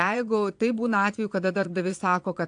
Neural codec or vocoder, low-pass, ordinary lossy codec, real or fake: vocoder, 22.05 kHz, 80 mel bands, Vocos; 9.9 kHz; AAC, 64 kbps; fake